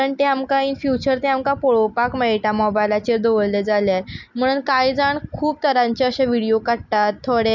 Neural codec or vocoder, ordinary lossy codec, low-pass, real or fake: none; none; 7.2 kHz; real